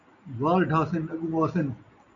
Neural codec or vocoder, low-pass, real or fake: none; 7.2 kHz; real